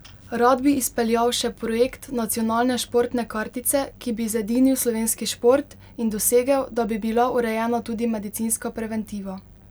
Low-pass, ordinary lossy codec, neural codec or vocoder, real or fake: none; none; none; real